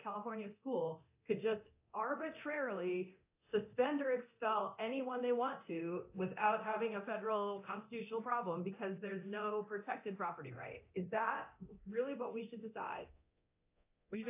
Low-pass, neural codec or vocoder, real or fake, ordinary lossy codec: 3.6 kHz; codec, 24 kHz, 0.9 kbps, DualCodec; fake; AAC, 24 kbps